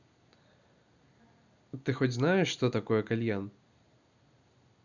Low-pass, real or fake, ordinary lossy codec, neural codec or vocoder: 7.2 kHz; real; none; none